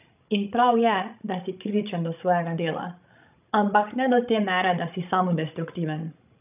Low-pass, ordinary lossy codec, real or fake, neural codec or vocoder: 3.6 kHz; none; fake; codec, 16 kHz, 16 kbps, FreqCodec, larger model